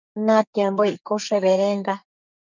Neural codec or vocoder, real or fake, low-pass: codec, 44.1 kHz, 2.6 kbps, SNAC; fake; 7.2 kHz